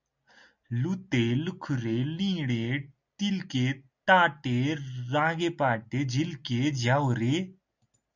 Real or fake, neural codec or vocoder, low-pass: real; none; 7.2 kHz